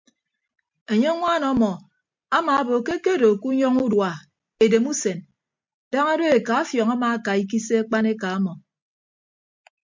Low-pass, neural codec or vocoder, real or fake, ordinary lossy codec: 7.2 kHz; none; real; MP3, 64 kbps